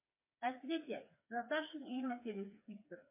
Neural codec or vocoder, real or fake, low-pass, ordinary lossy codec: codec, 16 kHz, 4 kbps, FreqCodec, smaller model; fake; 3.6 kHz; MP3, 24 kbps